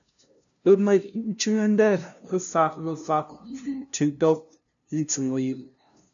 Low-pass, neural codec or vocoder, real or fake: 7.2 kHz; codec, 16 kHz, 0.5 kbps, FunCodec, trained on LibriTTS, 25 frames a second; fake